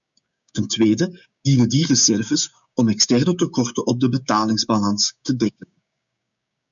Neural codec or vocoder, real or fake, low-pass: codec, 16 kHz, 6 kbps, DAC; fake; 7.2 kHz